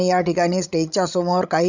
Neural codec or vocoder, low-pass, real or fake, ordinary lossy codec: codec, 16 kHz, 16 kbps, FreqCodec, larger model; 7.2 kHz; fake; none